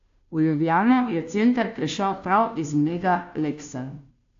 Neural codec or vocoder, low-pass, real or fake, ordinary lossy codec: codec, 16 kHz, 0.5 kbps, FunCodec, trained on Chinese and English, 25 frames a second; 7.2 kHz; fake; AAC, 48 kbps